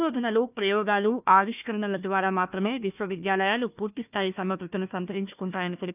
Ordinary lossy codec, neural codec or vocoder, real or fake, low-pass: none; codec, 16 kHz, 1 kbps, FunCodec, trained on Chinese and English, 50 frames a second; fake; 3.6 kHz